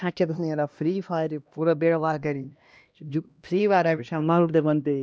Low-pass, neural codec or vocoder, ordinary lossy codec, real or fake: none; codec, 16 kHz, 1 kbps, X-Codec, HuBERT features, trained on LibriSpeech; none; fake